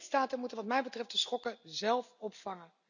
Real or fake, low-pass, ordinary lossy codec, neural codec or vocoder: real; 7.2 kHz; none; none